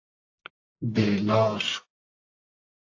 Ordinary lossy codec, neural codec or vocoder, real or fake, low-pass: AAC, 48 kbps; codec, 44.1 kHz, 1.7 kbps, Pupu-Codec; fake; 7.2 kHz